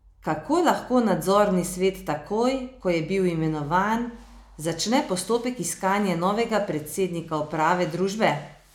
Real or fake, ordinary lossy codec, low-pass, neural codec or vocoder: real; none; 19.8 kHz; none